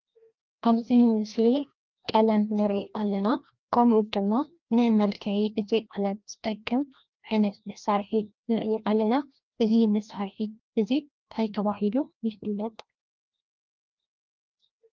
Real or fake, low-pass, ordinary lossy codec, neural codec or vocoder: fake; 7.2 kHz; Opus, 24 kbps; codec, 16 kHz, 1 kbps, FreqCodec, larger model